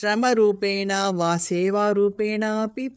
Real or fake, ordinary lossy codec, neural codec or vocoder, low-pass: fake; none; codec, 16 kHz, 4 kbps, FreqCodec, larger model; none